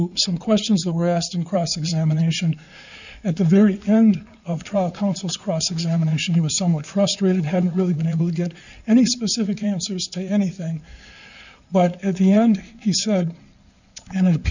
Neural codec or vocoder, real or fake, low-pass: codec, 16 kHz in and 24 kHz out, 2.2 kbps, FireRedTTS-2 codec; fake; 7.2 kHz